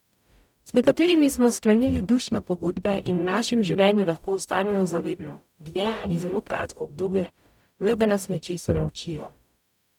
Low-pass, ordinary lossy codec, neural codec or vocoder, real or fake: 19.8 kHz; none; codec, 44.1 kHz, 0.9 kbps, DAC; fake